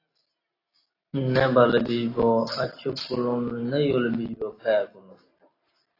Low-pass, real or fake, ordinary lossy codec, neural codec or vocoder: 5.4 kHz; real; AAC, 24 kbps; none